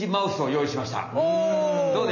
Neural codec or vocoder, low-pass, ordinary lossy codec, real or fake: none; 7.2 kHz; none; real